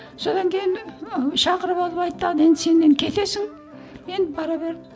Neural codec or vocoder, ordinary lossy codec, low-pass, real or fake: none; none; none; real